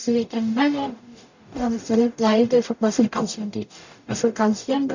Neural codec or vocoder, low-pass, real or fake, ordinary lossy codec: codec, 44.1 kHz, 0.9 kbps, DAC; 7.2 kHz; fake; none